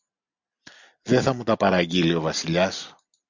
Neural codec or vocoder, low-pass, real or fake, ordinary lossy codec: none; 7.2 kHz; real; Opus, 64 kbps